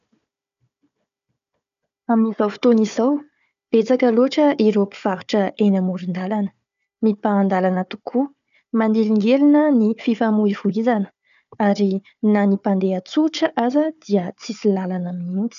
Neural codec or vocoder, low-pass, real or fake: codec, 16 kHz, 4 kbps, FunCodec, trained on Chinese and English, 50 frames a second; 7.2 kHz; fake